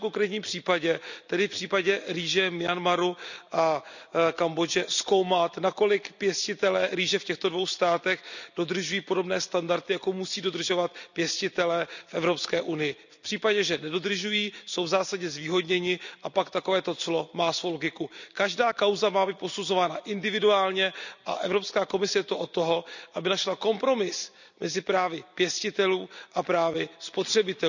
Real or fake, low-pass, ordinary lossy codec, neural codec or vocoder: real; 7.2 kHz; none; none